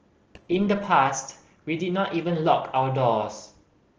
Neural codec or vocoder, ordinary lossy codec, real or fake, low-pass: none; Opus, 16 kbps; real; 7.2 kHz